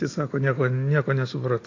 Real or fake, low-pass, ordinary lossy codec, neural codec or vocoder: real; 7.2 kHz; AAC, 32 kbps; none